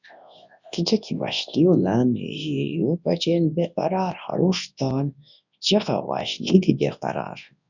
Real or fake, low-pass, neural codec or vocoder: fake; 7.2 kHz; codec, 24 kHz, 0.9 kbps, WavTokenizer, large speech release